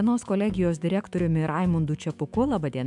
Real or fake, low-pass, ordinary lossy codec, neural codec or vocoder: fake; 10.8 kHz; MP3, 96 kbps; autoencoder, 48 kHz, 128 numbers a frame, DAC-VAE, trained on Japanese speech